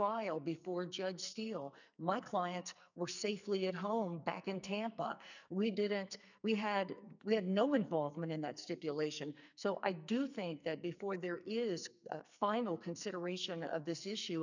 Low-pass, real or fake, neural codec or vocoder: 7.2 kHz; fake; codec, 44.1 kHz, 2.6 kbps, SNAC